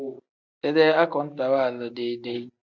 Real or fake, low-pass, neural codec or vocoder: real; 7.2 kHz; none